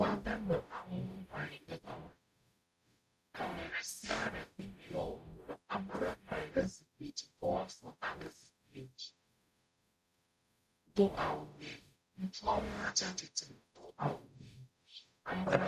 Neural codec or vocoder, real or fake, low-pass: codec, 44.1 kHz, 0.9 kbps, DAC; fake; 14.4 kHz